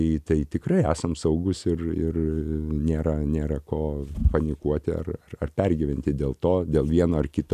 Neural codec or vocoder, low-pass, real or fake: none; 14.4 kHz; real